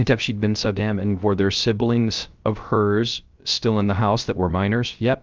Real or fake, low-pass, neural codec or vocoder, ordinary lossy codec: fake; 7.2 kHz; codec, 16 kHz, 0.3 kbps, FocalCodec; Opus, 24 kbps